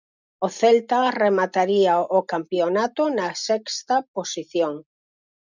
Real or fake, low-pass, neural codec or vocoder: real; 7.2 kHz; none